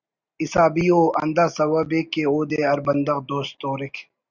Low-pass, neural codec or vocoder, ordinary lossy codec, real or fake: 7.2 kHz; none; Opus, 64 kbps; real